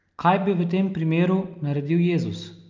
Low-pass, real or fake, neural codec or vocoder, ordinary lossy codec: 7.2 kHz; real; none; Opus, 24 kbps